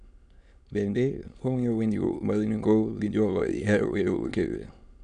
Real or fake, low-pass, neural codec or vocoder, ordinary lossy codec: fake; 9.9 kHz; autoencoder, 22.05 kHz, a latent of 192 numbers a frame, VITS, trained on many speakers; none